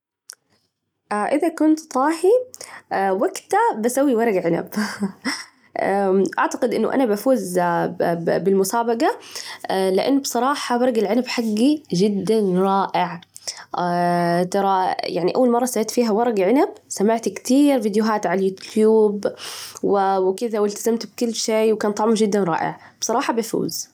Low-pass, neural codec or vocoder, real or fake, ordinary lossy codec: 19.8 kHz; none; real; none